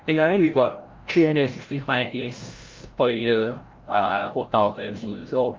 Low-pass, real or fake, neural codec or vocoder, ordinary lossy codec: 7.2 kHz; fake; codec, 16 kHz, 0.5 kbps, FreqCodec, larger model; Opus, 32 kbps